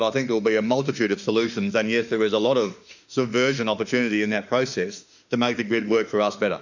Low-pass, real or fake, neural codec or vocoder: 7.2 kHz; fake; autoencoder, 48 kHz, 32 numbers a frame, DAC-VAE, trained on Japanese speech